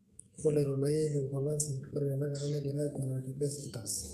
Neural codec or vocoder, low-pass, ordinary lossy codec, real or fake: codec, 32 kHz, 1.9 kbps, SNAC; 14.4 kHz; none; fake